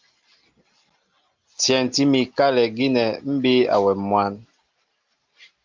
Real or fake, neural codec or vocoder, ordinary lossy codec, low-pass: real; none; Opus, 32 kbps; 7.2 kHz